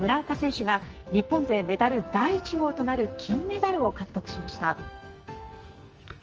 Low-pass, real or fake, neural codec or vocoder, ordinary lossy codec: 7.2 kHz; fake; codec, 44.1 kHz, 2.6 kbps, SNAC; Opus, 24 kbps